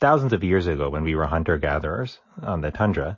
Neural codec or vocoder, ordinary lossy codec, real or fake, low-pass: none; MP3, 32 kbps; real; 7.2 kHz